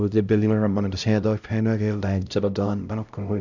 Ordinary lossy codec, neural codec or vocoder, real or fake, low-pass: none; codec, 16 kHz, 0.5 kbps, X-Codec, HuBERT features, trained on LibriSpeech; fake; 7.2 kHz